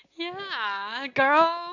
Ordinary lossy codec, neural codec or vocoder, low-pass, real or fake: AAC, 48 kbps; none; 7.2 kHz; real